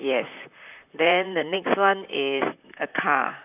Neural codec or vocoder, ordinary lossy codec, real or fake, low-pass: vocoder, 44.1 kHz, 128 mel bands, Pupu-Vocoder; none; fake; 3.6 kHz